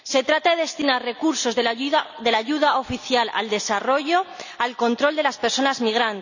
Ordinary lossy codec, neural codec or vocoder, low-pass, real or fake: none; none; 7.2 kHz; real